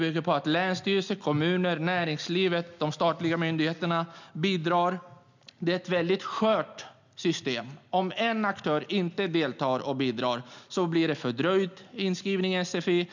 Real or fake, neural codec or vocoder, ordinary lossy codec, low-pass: real; none; none; 7.2 kHz